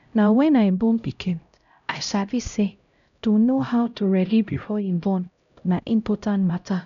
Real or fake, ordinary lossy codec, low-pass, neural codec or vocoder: fake; none; 7.2 kHz; codec, 16 kHz, 0.5 kbps, X-Codec, HuBERT features, trained on LibriSpeech